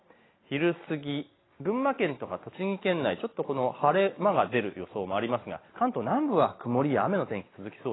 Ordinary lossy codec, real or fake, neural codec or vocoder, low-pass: AAC, 16 kbps; real; none; 7.2 kHz